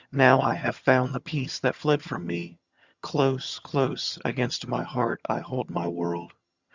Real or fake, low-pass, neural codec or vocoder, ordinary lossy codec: fake; 7.2 kHz; vocoder, 22.05 kHz, 80 mel bands, HiFi-GAN; Opus, 64 kbps